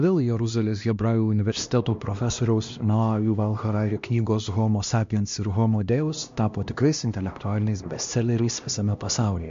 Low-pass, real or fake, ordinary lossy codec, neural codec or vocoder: 7.2 kHz; fake; MP3, 48 kbps; codec, 16 kHz, 1 kbps, X-Codec, HuBERT features, trained on LibriSpeech